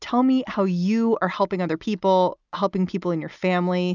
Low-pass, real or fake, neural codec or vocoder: 7.2 kHz; real; none